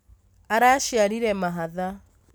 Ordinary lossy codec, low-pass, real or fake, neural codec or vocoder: none; none; real; none